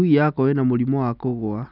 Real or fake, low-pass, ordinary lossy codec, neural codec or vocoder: real; 5.4 kHz; none; none